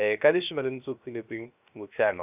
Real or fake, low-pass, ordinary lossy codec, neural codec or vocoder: fake; 3.6 kHz; none; codec, 16 kHz, 0.3 kbps, FocalCodec